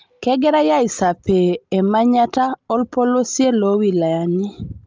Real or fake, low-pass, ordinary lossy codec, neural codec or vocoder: real; 7.2 kHz; Opus, 24 kbps; none